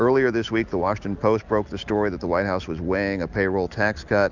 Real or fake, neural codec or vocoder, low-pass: real; none; 7.2 kHz